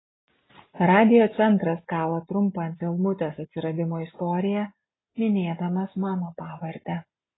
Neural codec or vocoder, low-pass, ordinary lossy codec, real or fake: none; 7.2 kHz; AAC, 16 kbps; real